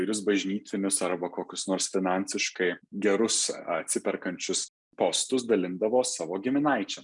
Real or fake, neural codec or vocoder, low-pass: real; none; 10.8 kHz